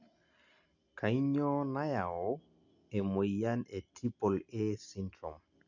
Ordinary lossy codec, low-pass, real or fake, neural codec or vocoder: none; 7.2 kHz; real; none